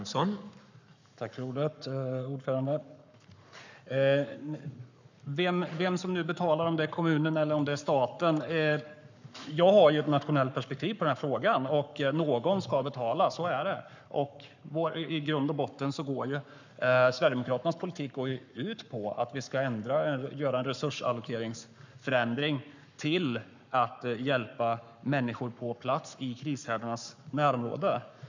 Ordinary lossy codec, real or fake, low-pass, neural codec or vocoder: none; fake; 7.2 kHz; codec, 44.1 kHz, 7.8 kbps, Pupu-Codec